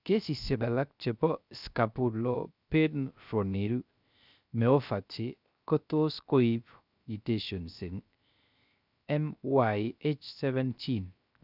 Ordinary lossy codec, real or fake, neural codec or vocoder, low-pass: none; fake; codec, 16 kHz, 0.3 kbps, FocalCodec; 5.4 kHz